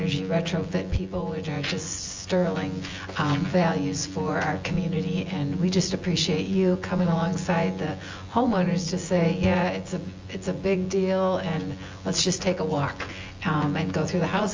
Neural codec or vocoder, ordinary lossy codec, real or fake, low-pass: vocoder, 24 kHz, 100 mel bands, Vocos; Opus, 32 kbps; fake; 7.2 kHz